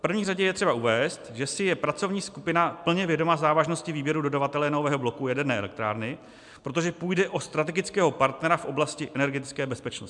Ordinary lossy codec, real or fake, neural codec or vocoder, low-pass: MP3, 96 kbps; real; none; 10.8 kHz